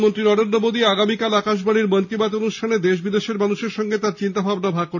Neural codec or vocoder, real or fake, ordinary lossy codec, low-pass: none; real; none; 7.2 kHz